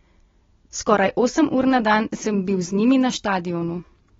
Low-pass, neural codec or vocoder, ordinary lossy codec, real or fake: 7.2 kHz; none; AAC, 24 kbps; real